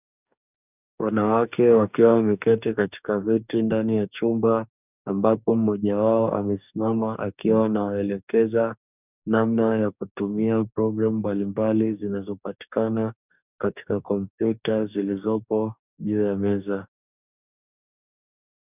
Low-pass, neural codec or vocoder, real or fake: 3.6 kHz; codec, 44.1 kHz, 2.6 kbps, DAC; fake